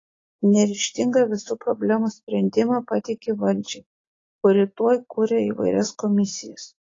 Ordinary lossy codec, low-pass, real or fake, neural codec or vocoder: AAC, 32 kbps; 7.2 kHz; real; none